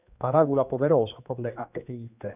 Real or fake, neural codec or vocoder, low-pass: fake; codec, 16 kHz, 2 kbps, X-Codec, HuBERT features, trained on general audio; 3.6 kHz